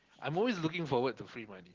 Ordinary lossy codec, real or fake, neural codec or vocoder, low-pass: Opus, 16 kbps; real; none; 7.2 kHz